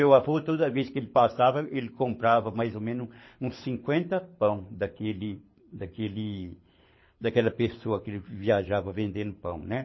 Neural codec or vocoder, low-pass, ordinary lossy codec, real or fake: codec, 24 kHz, 6 kbps, HILCodec; 7.2 kHz; MP3, 24 kbps; fake